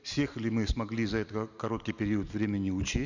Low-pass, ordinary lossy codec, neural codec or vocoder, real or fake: 7.2 kHz; none; none; real